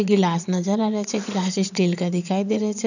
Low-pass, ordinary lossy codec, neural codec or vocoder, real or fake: 7.2 kHz; none; vocoder, 22.05 kHz, 80 mel bands, Vocos; fake